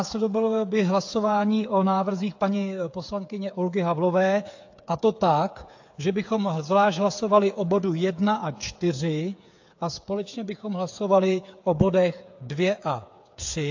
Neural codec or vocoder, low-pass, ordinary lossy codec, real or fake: codec, 16 kHz, 8 kbps, FreqCodec, smaller model; 7.2 kHz; AAC, 48 kbps; fake